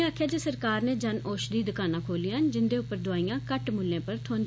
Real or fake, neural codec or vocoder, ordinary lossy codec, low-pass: real; none; none; none